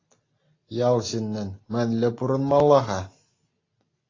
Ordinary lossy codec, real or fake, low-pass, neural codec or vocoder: AAC, 32 kbps; real; 7.2 kHz; none